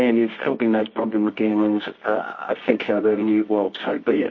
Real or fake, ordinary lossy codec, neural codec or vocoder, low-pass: fake; MP3, 32 kbps; codec, 24 kHz, 0.9 kbps, WavTokenizer, medium music audio release; 7.2 kHz